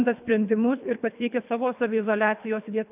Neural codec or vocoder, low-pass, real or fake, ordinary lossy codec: codec, 24 kHz, 3 kbps, HILCodec; 3.6 kHz; fake; AAC, 32 kbps